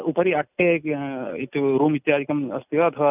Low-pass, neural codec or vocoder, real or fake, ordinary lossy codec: 3.6 kHz; none; real; none